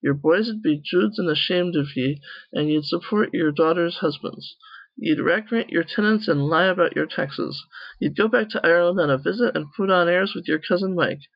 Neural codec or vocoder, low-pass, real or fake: none; 5.4 kHz; real